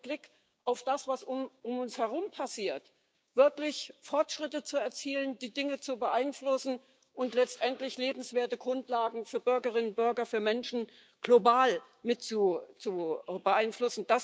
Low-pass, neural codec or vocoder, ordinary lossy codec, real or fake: none; codec, 16 kHz, 6 kbps, DAC; none; fake